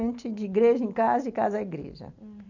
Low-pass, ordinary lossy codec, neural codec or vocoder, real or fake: 7.2 kHz; none; none; real